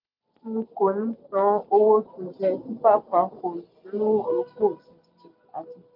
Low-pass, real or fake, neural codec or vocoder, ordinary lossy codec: 5.4 kHz; real; none; MP3, 48 kbps